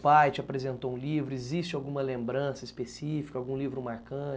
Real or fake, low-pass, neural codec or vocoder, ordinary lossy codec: real; none; none; none